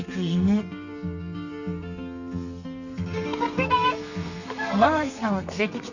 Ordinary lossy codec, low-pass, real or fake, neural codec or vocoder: none; 7.2 kHz; fake; codec, 32 kHz, 1.9 kbps, SNAC